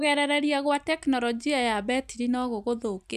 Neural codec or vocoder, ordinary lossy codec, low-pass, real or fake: none; none; 14.4 kHz; real